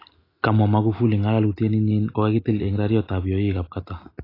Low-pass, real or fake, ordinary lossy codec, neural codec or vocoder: 5.4 kHz; real; AAC, 24 kbps; none